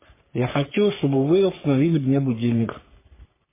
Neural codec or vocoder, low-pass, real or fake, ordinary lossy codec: codec, 44.1 kHz, 3.4 kbps, Pupu-Codec; 3.6 kHz; fake; MP3, 16 kbps